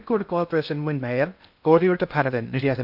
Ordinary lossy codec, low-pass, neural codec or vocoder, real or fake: none; 5.4 kHz; codec, 16 kHz in and 24 kHz out, 0.6 kbps, FocalCodec, streaming, 2048 codes; fake